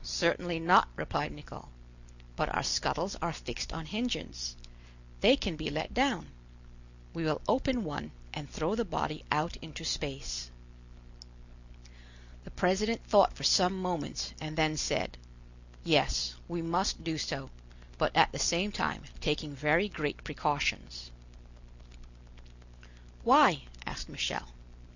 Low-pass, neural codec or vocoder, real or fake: 7.2 kHz; none; real